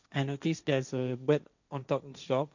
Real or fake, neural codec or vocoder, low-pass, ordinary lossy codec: fake; codec, 16 kHz, 1.1 kbps, Voila-Tokenizer; 7.2 kHz; none